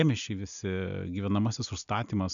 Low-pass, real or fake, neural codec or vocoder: 7.2 kHz; real; none